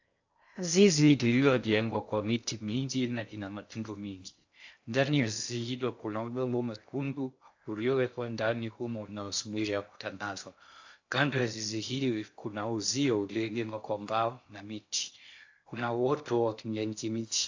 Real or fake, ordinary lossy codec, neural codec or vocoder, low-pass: fake; AAC, 48 kbps; codec, 16 kHz in and 24 kHz out, 0.6 kbps, FocalCodec, streaming, 2048 codes; 7.2 kHz